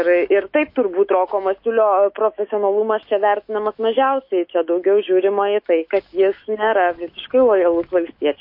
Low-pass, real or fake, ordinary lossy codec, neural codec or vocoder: 5.4 kHz; fake; MP3, 32 kbps; autoencoder, 48 kHz, 128 numbers a frame, DAC-VAE, trained on Japanese speech